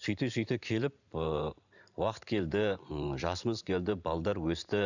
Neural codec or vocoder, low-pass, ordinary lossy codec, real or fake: vocoder, 44.1 kHz, 128 mel bands every 512 samples, BigVGAN v2; 7.2 kHz; none; fake